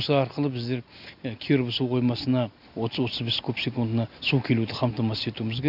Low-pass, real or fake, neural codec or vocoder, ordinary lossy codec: 5.4 kHz; real; none; none